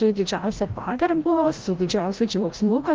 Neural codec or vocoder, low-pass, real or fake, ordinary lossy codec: codec, 16 kHz, 0.5 kbps, FreqCodec, larger model; 7.2 kHz; fake; Opus, 24 kbps